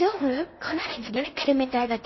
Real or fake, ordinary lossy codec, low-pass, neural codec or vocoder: fake; MP3, 24 kbps; 7.2 kHz; codec, 16 kHz in and 24 kHz out, 0.6 kbps, FocalCodec, streaming, 4096 codes